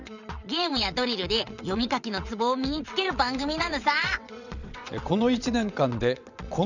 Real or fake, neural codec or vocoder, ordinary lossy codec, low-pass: fake; vocoder, 22.05 kHz, 80 mel bands, WaveNeXt; none; 7.2 kHz